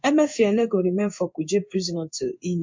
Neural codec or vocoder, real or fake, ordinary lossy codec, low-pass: codec, 16 kHz in and 24 kHz out, 1 kbps, XY-Tokenizer; fake; MP3, 48 kbps; 7.2 kHz